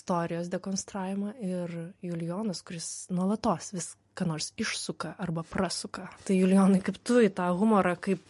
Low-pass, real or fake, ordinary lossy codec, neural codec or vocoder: 14.4 kHz; real; MP3, 48 kbps; none